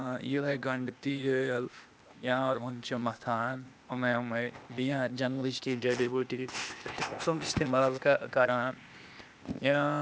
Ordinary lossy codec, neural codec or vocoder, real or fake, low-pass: none; codec, 16 kHz, 0.8 kbps, ZipCodec; fake; none